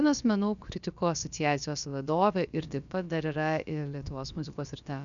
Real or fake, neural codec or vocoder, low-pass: fake; codec, 16 kHz, about 1 kbps, DyCAST, with the encoder's durations; 7.2 kHz